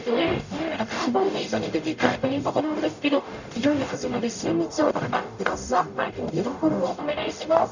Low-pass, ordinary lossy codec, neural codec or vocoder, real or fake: 7.2 kHz; none; codec, 44.1 kHz, 0.9 kbps, DAC; fake